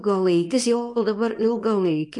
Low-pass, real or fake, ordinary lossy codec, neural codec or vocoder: 10.8 kHz; fake; MP3, 64 kbps; codec, 24 kHz, 0.9 kbps, WavTokenizer, small release